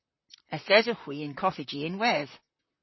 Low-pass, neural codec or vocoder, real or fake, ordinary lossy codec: 7.2 kHz; vocoder, 44.1 kHz, 128 mel bands, Pupu-Vocoder; fake; MP3, 24 kbps